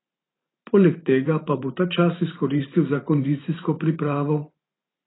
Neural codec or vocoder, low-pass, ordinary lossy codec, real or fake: vocoder, 44.1 kHz, 128 mel bands every 512 samples, BigVGAN v2; 7.2 kHz; AAC, 16 kbps; fake